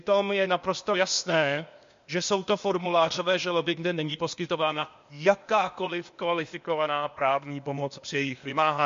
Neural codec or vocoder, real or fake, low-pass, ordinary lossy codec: codec, 16 kHz, 0.8 kbps, ZipCodec; fake; 7.2 kHz; MP3, 48 kbps